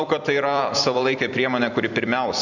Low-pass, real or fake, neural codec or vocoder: 7.2 kHz; fake; vocoder, 44.1 kHz, 128 mel bands every 256 samples, BigVGAN v2